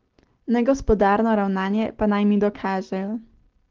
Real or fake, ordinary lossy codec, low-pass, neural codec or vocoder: real; Opus, 16 kbps; 7.2 kHz; none